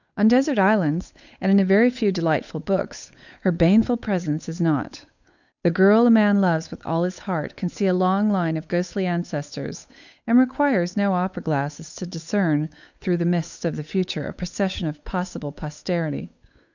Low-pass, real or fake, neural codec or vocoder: 7.2 kHz; fake; codec, 16 kHz, 8 kbps, FunCodec, trained on Chinese and English, 25 frames a second